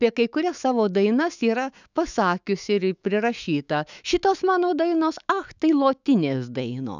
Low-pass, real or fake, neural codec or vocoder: 7.2 kHz; fake; autoencoder, 48 kHz, 128 numbers a frame, DAC-VAE, trained on Japanese speech